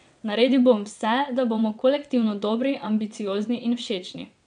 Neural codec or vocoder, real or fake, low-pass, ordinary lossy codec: vocoder, 22.05 kHz, 80 mel bands, WaveNeXt; fake; 9.9 kHz; none